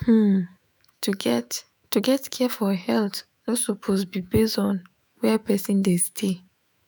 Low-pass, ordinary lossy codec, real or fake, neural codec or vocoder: none; none; fake; autoencoder, 48 kHz, 128 numbers a frame, DAC-VAE, trained on Japanese speech